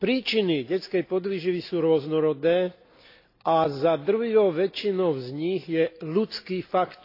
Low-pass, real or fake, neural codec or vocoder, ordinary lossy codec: 5.4 kHz; real; none; AAC, 32 kbps